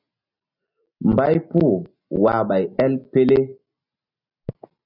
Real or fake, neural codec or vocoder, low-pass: real; none; 5.4 kHz